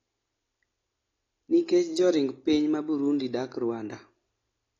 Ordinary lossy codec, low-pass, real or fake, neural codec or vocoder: AAC, 32 kbps; 7.2 kHz; real; none